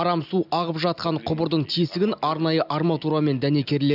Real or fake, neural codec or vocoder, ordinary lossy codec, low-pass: real; none; none; 5.4 kHz